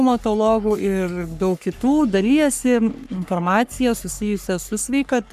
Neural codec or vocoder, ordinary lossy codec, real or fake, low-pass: codec, 44.1 kHz, 3.4 kbps, Pupu-Codec; MP3, 96 kbps; fake; 14.4 kHz